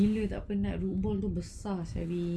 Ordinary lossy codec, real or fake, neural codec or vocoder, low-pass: none; real; none; none